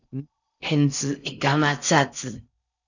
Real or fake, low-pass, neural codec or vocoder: fake; 7.2 kHz; codec, 16 kHz in and 24 kHz out, 0.6 kbps, FocalCodec, streaming, 4096 codes